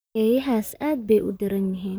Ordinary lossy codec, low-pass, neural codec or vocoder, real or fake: none; none; vocoder, 44.1 kHz, 128 mel bands, Pupu-Vocoder; fake